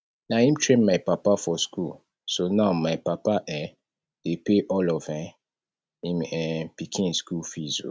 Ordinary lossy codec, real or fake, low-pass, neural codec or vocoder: none; real; none; none